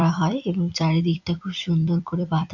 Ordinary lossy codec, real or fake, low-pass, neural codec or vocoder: none; fake; 7.2 kHz; vocoder, 44.1 kHz, 128 mel bands every 256 samples, BigVGAN v2